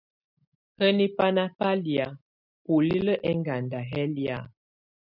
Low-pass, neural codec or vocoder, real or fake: 5.4 kHz; none; real